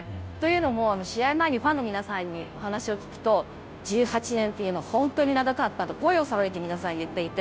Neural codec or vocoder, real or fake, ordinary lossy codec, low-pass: codec, 16 kHz, 0.5 kbps, FunCodec, trained on Chinese and English, 25 frames a second; fake; none; none